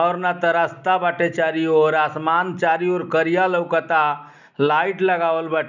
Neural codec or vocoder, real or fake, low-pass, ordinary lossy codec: none; real; 7.2 kHz; none